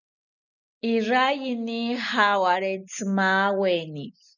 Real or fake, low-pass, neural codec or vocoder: real; 7.2 kHz; none